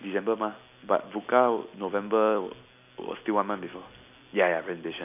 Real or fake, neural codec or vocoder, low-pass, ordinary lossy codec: real; none; 3.6 kHz; none